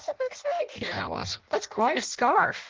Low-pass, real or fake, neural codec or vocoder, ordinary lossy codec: 7.2 kHz; fake; codec, 16 kHz in and 24 kHz out, 0.6 kbps, FireRedTTS-2 codec; Opus, 16 kbps